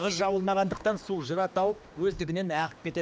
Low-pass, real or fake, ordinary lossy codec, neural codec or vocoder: none; fake; none; codec, 16 kHz, 2 kbps, X-Codec, HuBERT features, trained on general audio